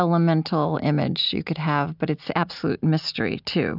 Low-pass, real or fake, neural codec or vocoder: 5.4 kHz; real; none